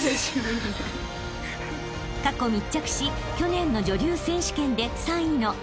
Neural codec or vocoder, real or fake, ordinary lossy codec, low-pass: none; real; none; none